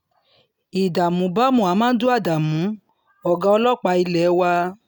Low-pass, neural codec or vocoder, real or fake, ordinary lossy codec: 19.8 kHz; none; real; none